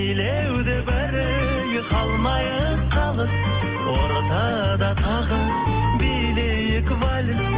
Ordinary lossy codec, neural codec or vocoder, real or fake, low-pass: Opus, 16 kbps; none; real; 3.6 kHz